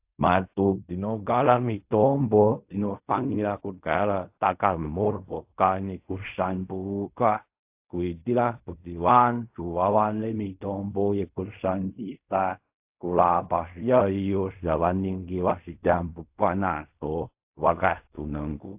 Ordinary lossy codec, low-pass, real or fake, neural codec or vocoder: AAC, 32 kbps; 3.6 kHz; fake; codec, 16 kHz in and 24 kHz out, 0.4 kbps, LongCat-Audio-Codec, fine tuned four codebook decoder